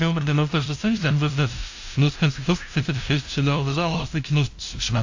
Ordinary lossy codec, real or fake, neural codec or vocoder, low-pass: AAC, 48 kbps; fake; codec, 16 kHz, 0.5 kbps, FunCodec, trained on LibriTTS, 25 frames a second; 7.2 kHz